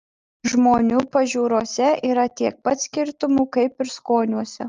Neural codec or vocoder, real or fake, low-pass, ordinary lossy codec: none; real; 7.2 kHz; Opus, 32 kbps